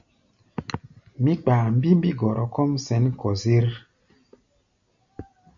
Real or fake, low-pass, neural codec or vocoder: real; 7.2 kHz; none